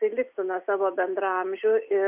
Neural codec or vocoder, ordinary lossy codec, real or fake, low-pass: none; Opus, 64 kbps; real; 3.6 kHz